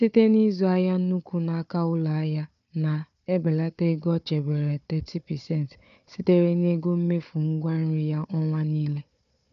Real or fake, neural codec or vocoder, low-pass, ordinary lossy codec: fake; codec, 16 kHz, 4 kbps, FunCodec, trained on Chinese and English, 50 frames a second; 7.2 kHz; none